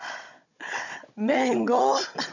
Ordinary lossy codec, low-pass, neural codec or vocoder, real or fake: none; 7.2 kHz; codec, 16 kHz, 16 kbps, FunCodec, trained on Chinese and English, 50 frames a second; fake